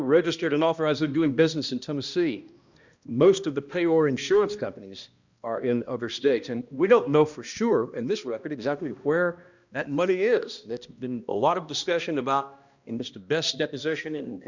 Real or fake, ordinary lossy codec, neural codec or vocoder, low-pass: fake; Opus, 64 kbps; codec, 16 kHz, 1 kbps, X-Codec, HuBERT features, trained on balanced general audio; 7.2 kHz